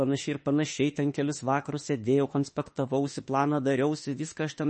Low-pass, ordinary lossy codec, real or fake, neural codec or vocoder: 10.8 kHz; MP3, 32 kbps; fake; autoencoder, 48 kHz, 32 numbers a frame, DAC-VAE, trained on Japanese speech